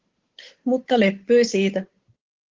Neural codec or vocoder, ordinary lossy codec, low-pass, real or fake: codec, 16 kHz, 8 kbps, FunCodec, trained on Chinese and English, 25 frames a second; Opus, 16 kbps; 7.2 kHz; fake